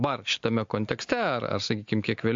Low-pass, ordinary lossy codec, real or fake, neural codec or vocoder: 7.2 kHz; MP3, 48 kbps; real; none